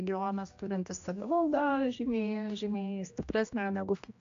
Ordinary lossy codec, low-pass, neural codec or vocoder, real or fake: AAC, 48 kbps; 7.2 kHz; codec, 16 kHz, 1 kbps, X-Codec, HuBERT features, trained on general audio; fake